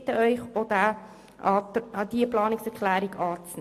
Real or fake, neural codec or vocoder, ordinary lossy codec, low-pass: real; none; AAC, 64 kbps; 14.4 kHz